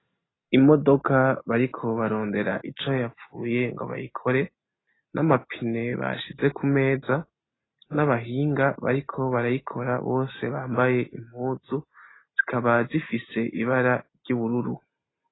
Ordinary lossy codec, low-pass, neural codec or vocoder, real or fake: AAC, 16 kbps; 7.2 kHz; none; real